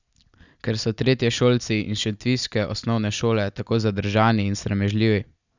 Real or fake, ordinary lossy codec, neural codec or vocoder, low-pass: real; none; none; 7.2 kHz